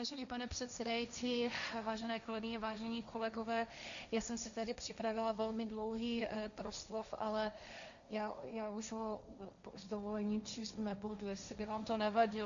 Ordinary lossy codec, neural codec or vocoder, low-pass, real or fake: MP3, 96 kbps; codec, 16 kHz, 1.1 kbps, Voila-Tokenizer; 7.2 kHz; fake